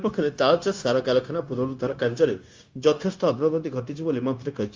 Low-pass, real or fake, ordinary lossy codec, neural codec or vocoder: 7.2 kHz; fake; Opus, 32 kbps; codec, 16 kHz, 0.9 kbps, LongCat-Audio-Codec